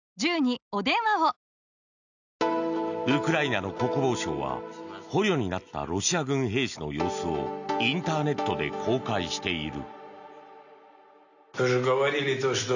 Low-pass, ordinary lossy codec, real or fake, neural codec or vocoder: 7.2 kHz; none; real; none